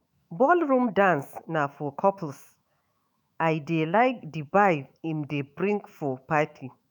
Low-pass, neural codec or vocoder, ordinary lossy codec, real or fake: 19.8 kHz; autoencoder, 48 kHz, 128 numbers a frame, DAC-VAE, trained on Japanese speech; none; fake